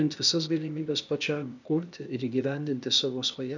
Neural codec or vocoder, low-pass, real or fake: codec, 16 kHz, 0.8 kbps, ZipCodec; 7.2 kHz; fake